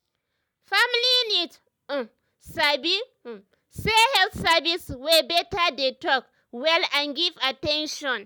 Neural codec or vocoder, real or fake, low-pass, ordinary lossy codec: none; real; none; none